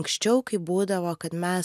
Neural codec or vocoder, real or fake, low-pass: none; real; 14.4 kHz